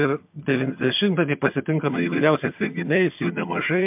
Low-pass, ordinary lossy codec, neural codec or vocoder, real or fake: 3.6 kHz; MP3, 32 kbps; vocoder, 22.05 kHz, 80 mel bands, HiFi-GAN; fake